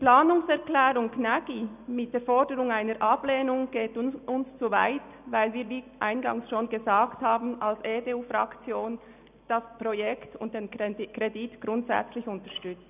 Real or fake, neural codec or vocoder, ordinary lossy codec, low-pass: real; none; none; 3.6 kHz